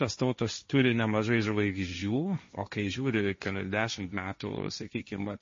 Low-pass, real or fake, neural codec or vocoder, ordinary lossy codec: 7.2 kHz; fake; codec, 16 kHz, 1.1 kbps, Voila-Tokenizer; MP3, 32 kbps